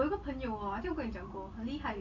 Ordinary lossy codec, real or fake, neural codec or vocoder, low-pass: none; real; none; 7.2 kHz